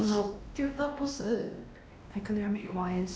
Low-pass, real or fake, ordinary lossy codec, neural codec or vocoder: none; fake; none; codec, 16 kHz, 1 kbps, X-Codec, WavLM features, trained on Multilingual LibriSpeech